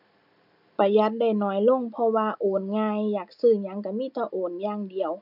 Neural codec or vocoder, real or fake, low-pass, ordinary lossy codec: none; real; 5.4 kHz; none